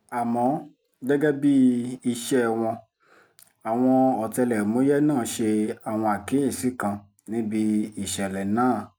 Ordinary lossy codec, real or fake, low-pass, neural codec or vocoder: none; real; none; none